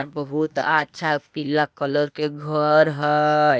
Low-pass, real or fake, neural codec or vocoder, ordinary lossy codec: none; fake; codec, 16 kHz, 0.8 kbps, ZipCodec; none